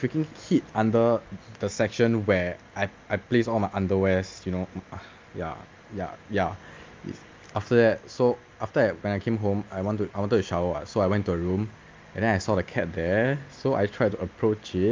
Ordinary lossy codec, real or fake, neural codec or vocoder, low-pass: Opus, 24 kbps; real; none; 7.2 kHz